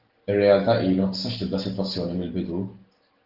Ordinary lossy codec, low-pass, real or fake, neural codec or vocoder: Opus, 16 kbps; 5.4 kHz; real; none